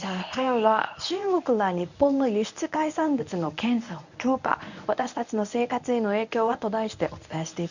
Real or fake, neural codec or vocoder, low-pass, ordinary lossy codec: fake; codec, 24 kHz, 0.9 kbps, WavTokenizer, medium speech release version 1; 7.2 kHz; none